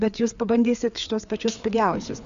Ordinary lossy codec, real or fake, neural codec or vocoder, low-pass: Opus, 64 kbps; fake; codec, 16 kHz, 4 kbps, FreqCodec, larger model; 7.2 kHz